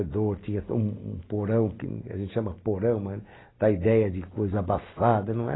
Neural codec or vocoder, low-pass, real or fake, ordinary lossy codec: none; 7.2 kHz; real; AAC, 16 kbps